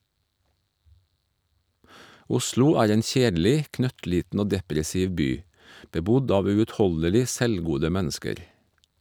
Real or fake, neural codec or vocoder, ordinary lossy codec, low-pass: real; none; none; none